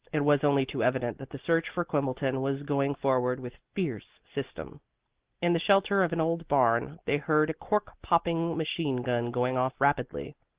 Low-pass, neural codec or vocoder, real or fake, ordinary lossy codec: 3.6 kHz; none; real; Opus, 16 kbps